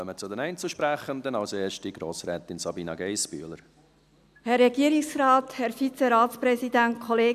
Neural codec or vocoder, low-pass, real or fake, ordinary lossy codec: none; 14.4 kHz; real; none